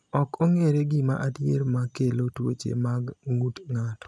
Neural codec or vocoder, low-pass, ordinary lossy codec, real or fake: none; none; none; real